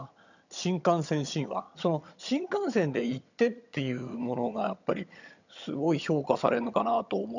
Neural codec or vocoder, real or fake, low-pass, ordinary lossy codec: vocoder, 22.05 kHz, 80 mel bands, HiFi-GAN; fake; 7.2 kHz; none